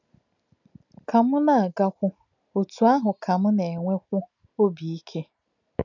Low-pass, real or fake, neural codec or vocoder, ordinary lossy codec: 7.2 kHz; real; none; none